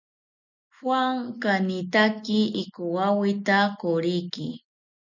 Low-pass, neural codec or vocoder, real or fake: 7.2 kHz; none; real